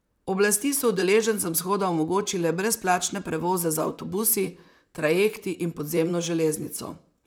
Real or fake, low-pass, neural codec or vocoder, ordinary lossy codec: fake; none; vocoder, 44.1 kHz, 128 mel bands, Pupu-Vocoder; none